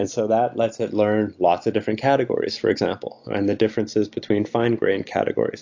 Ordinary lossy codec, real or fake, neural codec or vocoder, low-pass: AAC, 48 kbps; real; none; 7.2 kHz